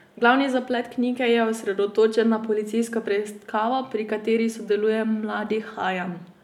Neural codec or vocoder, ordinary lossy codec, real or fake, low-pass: none; none; real; 19.8 kHz